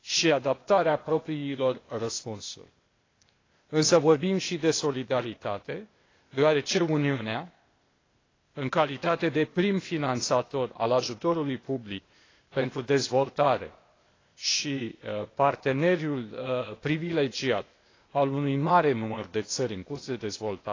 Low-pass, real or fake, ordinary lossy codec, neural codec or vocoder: 7.2 kHz; fake; AAC, 32 kbps; codec, 16 kHz, 0.8 kbps, ZipCodec